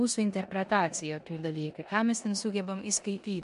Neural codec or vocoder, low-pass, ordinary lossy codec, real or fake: codec, 16 kHz in and 24 kHz out, 0.9 kbps, LongCat-Audio-Codec, four codebook decoder; 10.8 kHz; MP3, 64 kbps; fake